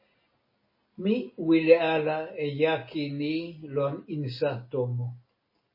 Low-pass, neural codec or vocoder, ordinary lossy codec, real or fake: 5.4 kHz; none; MP3, 24 kbps; real